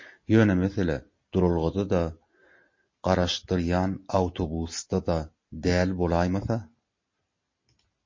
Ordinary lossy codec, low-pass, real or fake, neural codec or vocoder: MP3, 32 kbps; 7.2 kHz; real; none